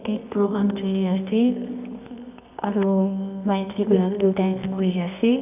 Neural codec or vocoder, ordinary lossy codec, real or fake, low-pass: codec, 24 kHz, 0.9 kbps, WavTokenizer, medium music audio release; none; fake; 3.6 kHz